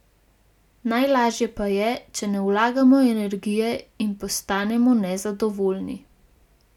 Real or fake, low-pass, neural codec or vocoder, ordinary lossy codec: real; 19.8 kHz; none; none